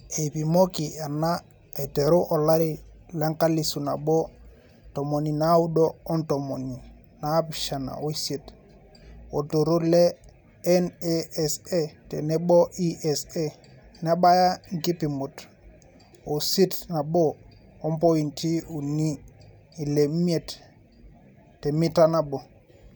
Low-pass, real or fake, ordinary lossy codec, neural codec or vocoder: none; real; none; none